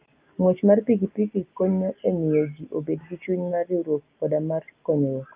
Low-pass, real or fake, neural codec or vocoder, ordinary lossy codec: 3.6 kHz; real; none; Opus, 24 kbps